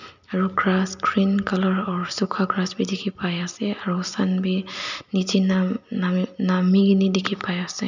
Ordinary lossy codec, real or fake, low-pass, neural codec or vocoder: none; real; 7.2 kHz; none